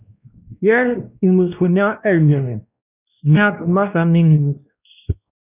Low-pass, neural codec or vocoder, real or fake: 3.6 kHz; codec, 16 kHz, 1 kbps, X-Codec, WavLM features, trained on Multilingual LibriSpeech; fake